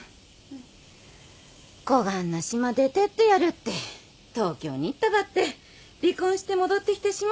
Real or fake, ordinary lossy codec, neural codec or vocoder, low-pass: real; none; none; none